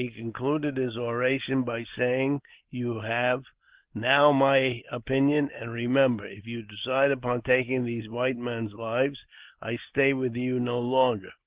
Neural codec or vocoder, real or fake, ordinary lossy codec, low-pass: none; real; Opus, 16 kbps; 3.6 kHz